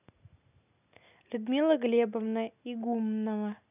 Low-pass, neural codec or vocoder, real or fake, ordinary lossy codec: 3.6 kHz; none; real; none